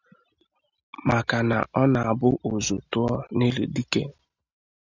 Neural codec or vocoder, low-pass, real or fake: none; 7.2 kHz; real